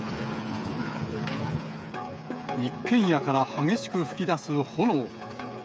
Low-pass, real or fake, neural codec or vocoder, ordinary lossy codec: none; fake; codec, 16 kHz, 8 kbps, FreqCodec, smaller model; none